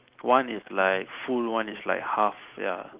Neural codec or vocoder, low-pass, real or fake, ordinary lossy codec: none; 3.6 kHz; real; Opus, 32 kbps